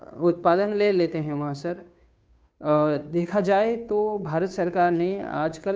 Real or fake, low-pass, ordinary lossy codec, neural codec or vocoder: fake; none; none; codec, 16 kHz, 2 kbps, FunCodec, trained on Chinese and English, 25 frames a second